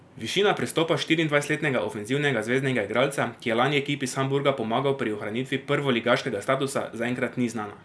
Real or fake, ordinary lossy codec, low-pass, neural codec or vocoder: real; none; none; none